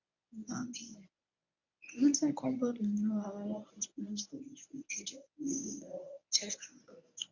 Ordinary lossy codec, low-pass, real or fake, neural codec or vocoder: none; 7.2 kHz; fake; codec, 24 kHz, 0.9 kbps, WavTokenizer, medium speech release version 1